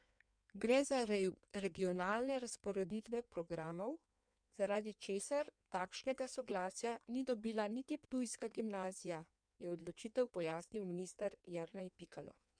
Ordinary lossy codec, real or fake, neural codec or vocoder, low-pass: MP3, 96 kbps; fake; codec, 16 kHz in and 24 kHz out, 1.1 kbps, FireRedTTS-2 codec; 9.9 kHz